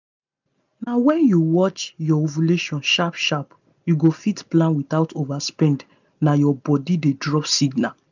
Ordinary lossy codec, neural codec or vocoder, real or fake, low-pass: none; none; real; 7.2 kHz